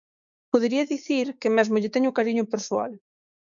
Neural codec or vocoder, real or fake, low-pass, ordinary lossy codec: codec, 16 kHz, 6 kbps, DAC; fake; 7.2 kHz; MP3, 96 kbps